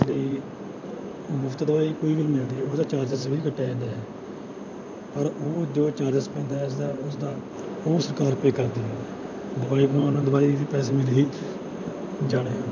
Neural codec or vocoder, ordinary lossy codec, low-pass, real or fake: vocoder, 44.1 kHz, 128 mel bands, Pupu-Vocoder; none; 7.2 kHz; fake